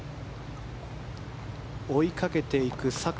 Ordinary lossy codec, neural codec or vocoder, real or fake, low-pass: none; none; real; none